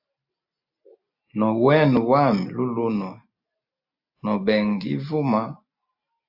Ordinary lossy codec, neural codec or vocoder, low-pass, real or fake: AAC, 48 kbps; none; 5.4 kHz; real